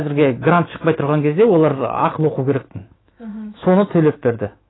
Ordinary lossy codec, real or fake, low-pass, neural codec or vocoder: AAC, 16 kbps; fake; 7.2 kHz; autoencoder, 48 kHz, 128 numbers a frame, DAC-VAE, trained on Japanese speech